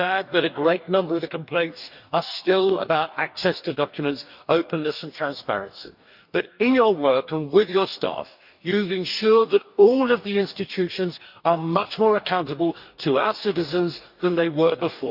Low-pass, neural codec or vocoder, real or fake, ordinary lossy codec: 5.4 kHz; codec, 44.1 kHz, 2.6 kbps, DAC; fake; none